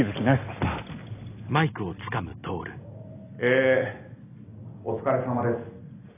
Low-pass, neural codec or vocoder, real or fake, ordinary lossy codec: 3.6 kHz; none; real; AAC, 24 kbps